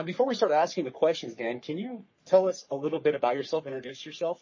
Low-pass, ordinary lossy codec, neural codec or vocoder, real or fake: 7.2 kHz; MP3, 32 kbps; codec, 44.1 kHz, 3.4 kbps, Pupu-Codec; fake